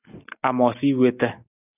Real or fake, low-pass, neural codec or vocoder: real; 3.6 kHz; none